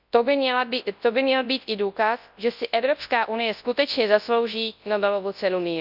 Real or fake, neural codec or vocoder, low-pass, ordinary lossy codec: fake; codec, 24 kHz, 0.9 kbps, WavTokenizer, large speech release; 5.4 kHz; none